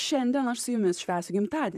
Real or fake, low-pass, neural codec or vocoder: fake; 14.4 kHz; vocoder, 44.1 kHz, 128 mel bands, Pupu-Vocoder